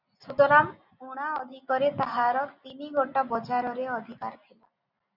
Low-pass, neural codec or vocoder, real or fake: 5.4 kHz; none; real